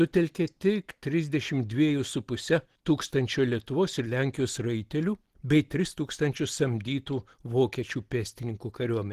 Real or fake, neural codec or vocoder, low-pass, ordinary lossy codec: real; none; 14.4 kHz; Opus, 16 kbps